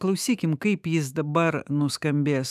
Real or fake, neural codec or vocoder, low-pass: fake; autoencoder, 48 kHz, 128 numbers a frame, DAC-VAE, trained on Japanese speech; 14.4 kHz